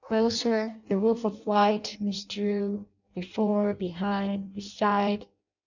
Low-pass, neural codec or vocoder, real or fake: 7.2 kHz; codec, 16 kHz in and 24 kHz out, 0.6 kbps, FireRedTTS-2 codec; fake